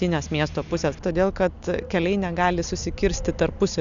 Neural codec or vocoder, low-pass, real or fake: none; 7.2 kHz; real